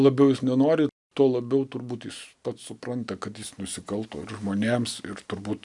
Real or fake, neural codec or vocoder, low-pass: real; none; 10.8 kHz